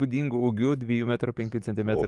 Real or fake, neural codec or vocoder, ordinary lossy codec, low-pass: fake; codec, 44.1 kHz, 7.8 kbps, DAC; Opus, 32 kbps; 10.8 kHz